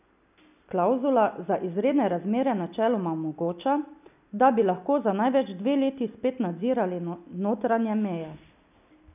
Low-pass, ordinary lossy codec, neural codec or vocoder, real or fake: 3.6 kHz; none; none; real